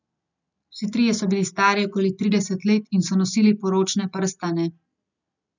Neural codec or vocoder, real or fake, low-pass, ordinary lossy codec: none; real; 7.2 kHz; none